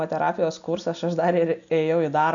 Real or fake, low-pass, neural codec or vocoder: real; 7.2 kHz; none